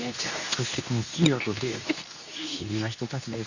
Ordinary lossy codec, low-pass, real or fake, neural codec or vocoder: none; 7.2 kHz; fake; codec, 24 kHz, 0.9 kbps, WavTokenizer, medium speech release version 2